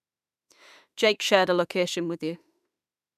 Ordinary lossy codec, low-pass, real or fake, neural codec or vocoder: none; 14.4 kHz; fake; autoencoder, 48 kHz, 32 numbers a frame, DAC-VAE, trained on Japanese speech